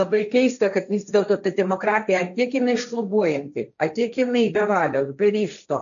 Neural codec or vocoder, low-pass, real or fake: codec, 16 kHz, 1.1 kbps, Voila-Tokenizer; 7.2 kHz; fake